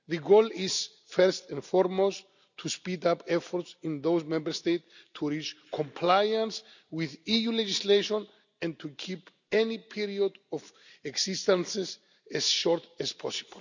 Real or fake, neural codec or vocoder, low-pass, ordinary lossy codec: real; none; 7.2 kHz; none